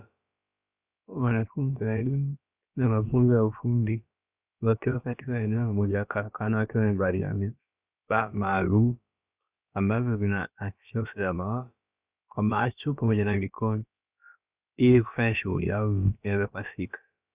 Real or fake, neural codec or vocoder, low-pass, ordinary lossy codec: fake; codec, 16 kHz, about 1 kbps, DyCAST, with the encoder's durations; 3.6 kHz; Opus, 64 kbps